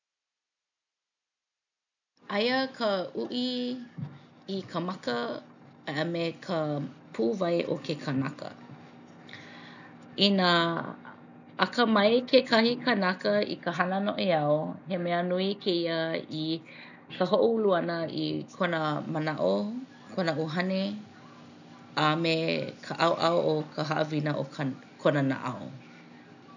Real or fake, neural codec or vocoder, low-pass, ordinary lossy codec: real; none; 7.2 kHz; none